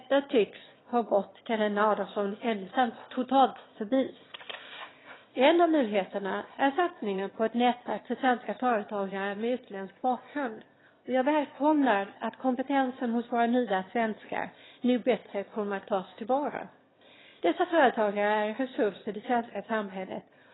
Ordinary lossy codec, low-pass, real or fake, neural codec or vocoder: AAC, 16 kbps; 7.2 kHz; fake; autoencoder, 22.05 kHz, a latent of 192 numbers a frame, VITS, trained on one speaker